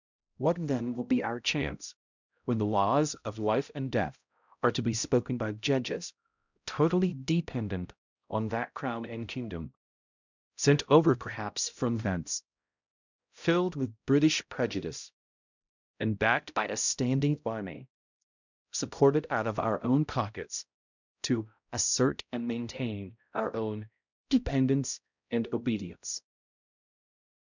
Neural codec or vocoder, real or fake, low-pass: codec, 16 kHz, 0.5 kbps, X-Codec, HuBERT features, trained on balanced general audio; fake; 7.2 kHz